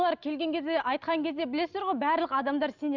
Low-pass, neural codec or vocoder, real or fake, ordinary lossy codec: 7.2 kHz; none; real; none